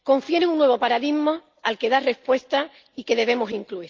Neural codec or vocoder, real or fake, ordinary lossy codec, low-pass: none; real; Opus, 32 kbps; 7.2 kHz